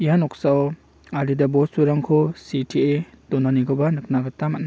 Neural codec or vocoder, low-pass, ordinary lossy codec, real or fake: none; none; none; real